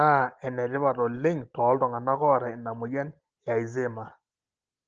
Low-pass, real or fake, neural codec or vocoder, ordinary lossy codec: 7.2 kHz; real; none; Opus, 16 kbps